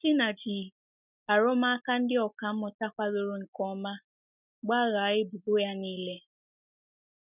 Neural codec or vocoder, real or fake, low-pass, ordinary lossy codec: none; real; 3.6 kHz; none